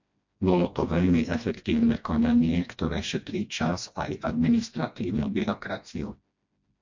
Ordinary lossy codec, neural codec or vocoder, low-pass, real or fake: MP3, 48 kbps; codec, 16 kHz, 1 kbps, FreqCodec, smaller model; 7.2 kHz; fake